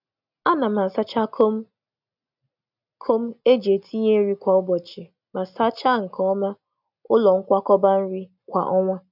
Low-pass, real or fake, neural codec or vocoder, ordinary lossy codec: 5.4 kHz; real; none; none